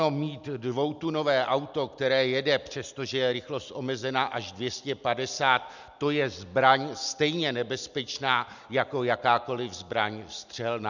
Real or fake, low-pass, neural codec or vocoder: real; 7.2 kHz; none